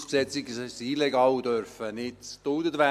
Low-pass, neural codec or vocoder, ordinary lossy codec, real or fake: 14.4 kHz; none; none; real